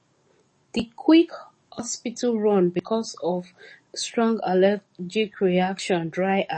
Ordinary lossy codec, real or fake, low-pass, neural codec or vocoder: MP3, 32 kbps; fake; 10.8 kHz; codec, 44.1 kHz, 7.8 kbps, DAC